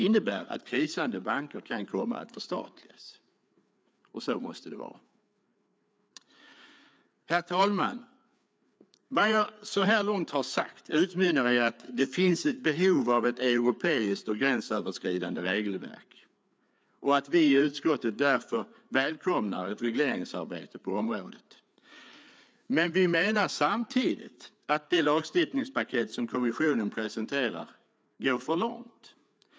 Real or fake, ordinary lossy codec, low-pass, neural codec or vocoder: fake; none; none; codec, 16 kHz, 4 kbps, FreqCodec, larger model